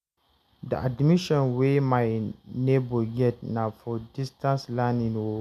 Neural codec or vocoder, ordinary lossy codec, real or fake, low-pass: none; none; real; 14.4 kHz